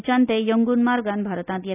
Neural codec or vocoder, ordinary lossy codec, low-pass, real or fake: none; none; 3.6 kHz; real